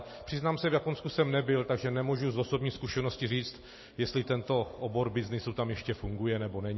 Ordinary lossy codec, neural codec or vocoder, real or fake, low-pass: MP3, 24 kbps; none; real; 7.2 kHz